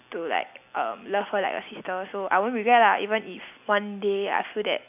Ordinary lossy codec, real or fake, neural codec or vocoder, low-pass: none; real; none; 3.6 kHz